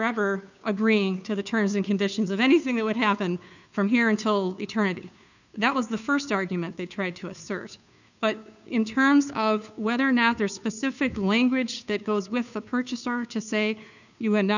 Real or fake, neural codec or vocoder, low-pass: fake; codec, 16 kHz, 2 kbps, FunCodec, trained on Chinese and English, 25 frames a second; 7.2 kHz